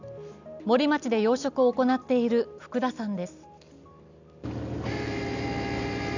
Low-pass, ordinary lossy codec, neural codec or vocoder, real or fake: 7.2 kHz; none; none; real